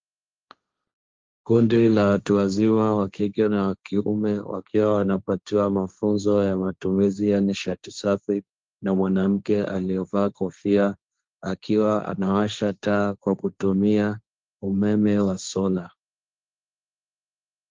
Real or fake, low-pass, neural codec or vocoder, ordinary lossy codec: fake; 7.2 kHz; codec, 16 kHz, 1.1 kbps, Voila-Tokenizer; Opus, 32 kbps